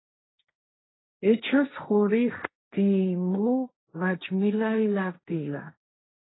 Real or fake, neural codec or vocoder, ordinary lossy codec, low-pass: fake; codec, 16 kHz, 1.1 kbps, Voila-Tokenizer; AAC, 16 kbps; 7.2 kHz